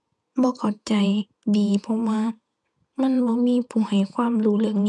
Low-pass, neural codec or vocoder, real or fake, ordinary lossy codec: 10.8 kHz; vocoder, 48 kHz, 128 mel bands, Vocos; fake; none